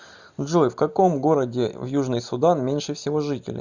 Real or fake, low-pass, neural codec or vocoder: real; 7.2 kHz; none